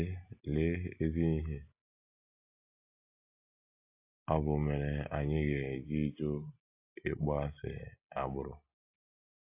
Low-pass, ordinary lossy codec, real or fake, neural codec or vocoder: 3.6 kHz; AAC, 32 kbps; real; none